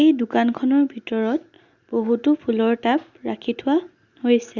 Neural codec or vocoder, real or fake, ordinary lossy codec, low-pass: none; real; Opus, 64 kbps; 7.2 kHz